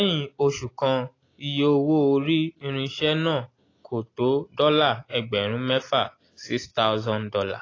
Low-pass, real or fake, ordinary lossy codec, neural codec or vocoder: 7.2 kHz; real; AAC, 32 kbps; none